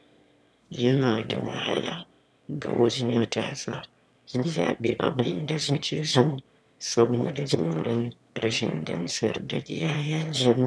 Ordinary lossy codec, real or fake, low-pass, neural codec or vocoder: none; fake; none; autoencoder, 22.05 kHz, a latent of 192 numbers a frame, VITS, trained on one speaker